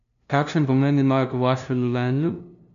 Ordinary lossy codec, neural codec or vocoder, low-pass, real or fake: none; codec, 16 kHz, 0.5 kbps, FunCodec, trained on LibriTTS, 25 frames a second; 7.2 kHz; fake